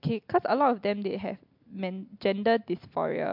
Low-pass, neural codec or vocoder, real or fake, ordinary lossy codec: 5.4 kHz; none; real; MP3, 48 kbps